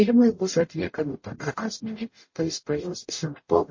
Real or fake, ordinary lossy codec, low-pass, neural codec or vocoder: fake; MP3, 32 kbps; 7.2 kHz; codec, 44.1 kHz, 0.9 kbps, DAC